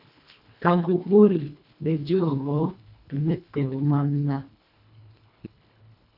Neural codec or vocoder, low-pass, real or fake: codec, 24 kHz, 1.5 kbps, HILCodec; 5.4 kHz; fake